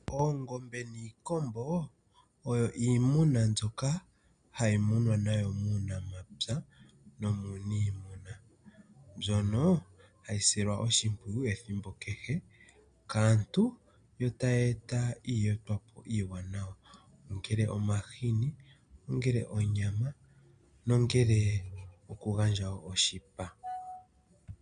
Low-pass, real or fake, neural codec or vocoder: 9.9 kHz; real; none